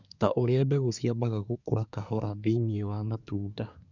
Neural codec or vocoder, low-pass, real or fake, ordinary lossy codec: codec, 24 kHz, 1 kbps, SNAC; 7.2 kHz; fake; none